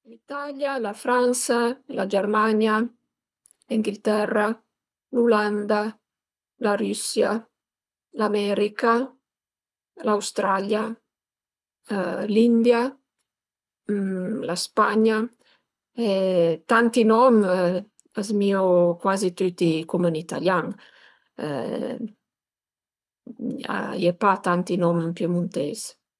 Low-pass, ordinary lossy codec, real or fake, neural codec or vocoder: none; none; fake; codec, 24 kHz, 6 kbps, HILCodec